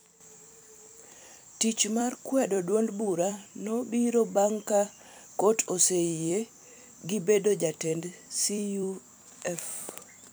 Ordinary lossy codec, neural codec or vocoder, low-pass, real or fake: none; vocoder, 44.1 kHz, 128 mel bands every 256 samples, BigVGAN v2; none; fake